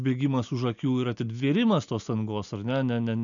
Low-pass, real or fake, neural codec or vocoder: 7.2 kHz; real; none